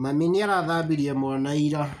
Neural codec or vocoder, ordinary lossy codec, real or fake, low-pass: none; none; real; 14.4 kHz